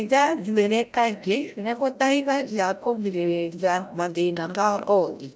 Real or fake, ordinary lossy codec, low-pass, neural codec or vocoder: fake; none; none; codec, 16 kHz, 0.5 kbps, FreqCodec, larger model